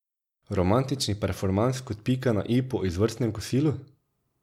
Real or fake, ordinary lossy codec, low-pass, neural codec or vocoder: real; MP3, 96 kbps; 19.8 kHz; none